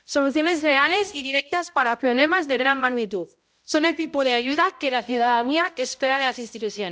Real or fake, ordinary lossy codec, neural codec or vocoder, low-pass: fake; none; codec, 16 kHz, 0.5 kbps, X-Codec, HuBERT features, trained on balanced general audio; none